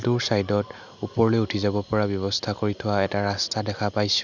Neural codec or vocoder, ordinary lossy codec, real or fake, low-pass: none; none; real; 7.2 kHz